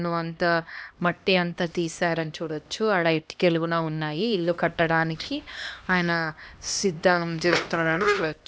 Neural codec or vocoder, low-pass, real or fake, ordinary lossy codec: codec, 16 kHz, 1 kbps, X-Codec, HuBERT features, trained on LibriSpeech; none; fake; none